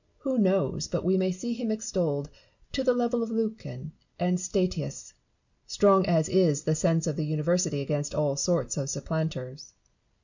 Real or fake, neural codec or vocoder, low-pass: real; none; 7.2 kHz